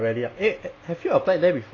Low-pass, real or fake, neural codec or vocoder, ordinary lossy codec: 7.2 kHz; real; none; AAC, 32 kbps